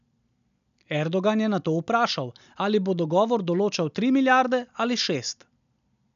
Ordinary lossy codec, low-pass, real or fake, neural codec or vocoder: none; 7.2 kHz; real; none